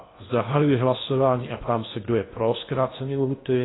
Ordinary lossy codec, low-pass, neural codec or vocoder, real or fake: AAC, 16 kbps; 7.2 kHz; codec, 16 kHz in and 24 kHz out, 0.8 kbps, FocalCodec, streaming, 65536 codes; fake